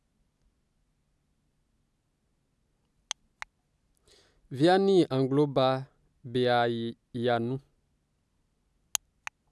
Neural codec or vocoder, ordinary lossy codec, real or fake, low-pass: none; none; real; none